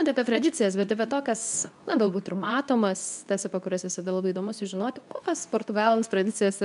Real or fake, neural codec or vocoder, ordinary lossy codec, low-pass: fake; codec, 24 kHz, 0.9 kbps, WavTokenizer, medium speech release version 2; MP3, 96 kbps; 10.8 kHz